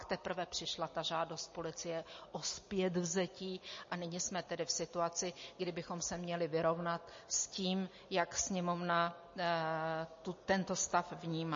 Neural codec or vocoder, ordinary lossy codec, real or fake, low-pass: none; MP3, 32 kbps; real; 7.2 kHz